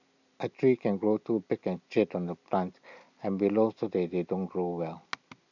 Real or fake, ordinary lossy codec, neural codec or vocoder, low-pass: real; none; none; 7.2 kHz